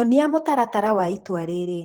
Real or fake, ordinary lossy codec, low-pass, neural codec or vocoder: fake; Opus, 16 kbps; 19.8 kHz; vocoder, 44.1 kHz, 128 mel bands, Pupu-Vocoder